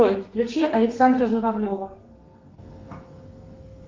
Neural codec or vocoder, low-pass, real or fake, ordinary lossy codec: codec, 32 kHz, 1.9 kbps, SNAC; 7.2 kHz; fake; Opus, 32 kbps